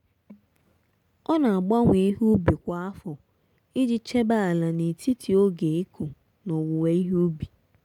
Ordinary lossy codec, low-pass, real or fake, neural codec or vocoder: none; 19.8 kHz; real; none